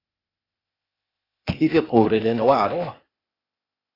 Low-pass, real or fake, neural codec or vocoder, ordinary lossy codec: 5.4 kHz; fake; codec, 16 kHz, 0.8 kbps, ZipCodec; AAC, 24 kbps